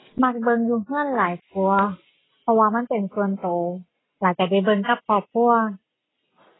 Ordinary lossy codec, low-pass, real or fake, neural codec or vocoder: AAC, 16 kbps; 7.2 kHz; real; none